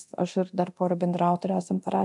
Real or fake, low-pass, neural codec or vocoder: fake; 10.8 kHz; codec, 24 kHz, 0.9 kbps, DualCodec